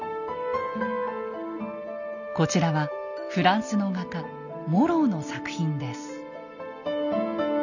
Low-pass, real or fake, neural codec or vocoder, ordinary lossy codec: 7.2 kHz; real; none; none